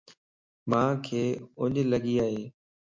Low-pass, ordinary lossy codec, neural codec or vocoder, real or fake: 7.2 kHz; MP3, 64 kbps; none; real